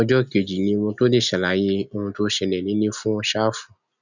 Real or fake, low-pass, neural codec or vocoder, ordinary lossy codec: real; 7.2 kHz; none; none